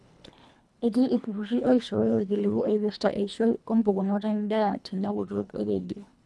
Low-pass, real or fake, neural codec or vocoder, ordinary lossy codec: none; fake; codec, 24 kHz, 1.5 kbps, HILCodec; none